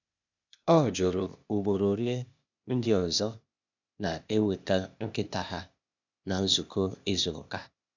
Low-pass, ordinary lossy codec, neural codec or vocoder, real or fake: 7.2 kHz; none; codec, 16 kHz, 0.8 kbps, ZipCodec; fake